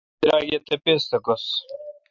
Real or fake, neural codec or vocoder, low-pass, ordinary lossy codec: real; none; 7.2 kHz; MP3, 64 kbps